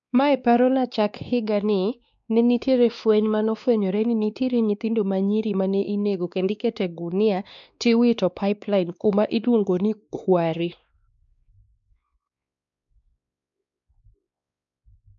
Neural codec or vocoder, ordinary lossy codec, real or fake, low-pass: codec, 16 kHz, 2 kbps, X-Codec, WavLM features, trained on Multilingual LibriSpeech; none; fake; 7.2 kHz